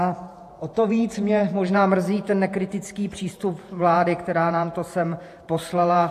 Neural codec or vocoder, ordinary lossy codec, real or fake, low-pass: vocoder, 48 kHz, 128 mel bands, Vocos; AAC, 64 kbps; fake; 14.4 kHz